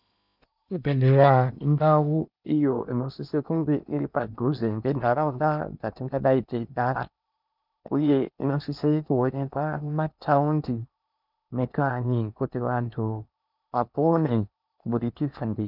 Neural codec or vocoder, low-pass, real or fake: codec, 16 kHz in and 24 kHz out, 0.8 kbps, FocalCodec, streaming, 65536 codes; 5.4 kHz; fake